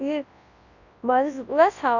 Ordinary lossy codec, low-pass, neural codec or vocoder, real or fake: none; 7.2 kHz; codec, 24 kHz, 0.9 kbps, WavTokenizer, large speech release; fake